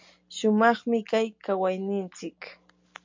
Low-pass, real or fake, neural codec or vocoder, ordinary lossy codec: 7.2 kHz; real; none; MP3, 64 kbps